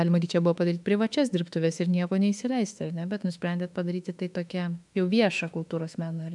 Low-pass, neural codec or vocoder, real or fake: 10.8 kHz; autoencoder, 48 kHz, 32 numbers a frame, DAC-VAE, trained on Japanese speech; fake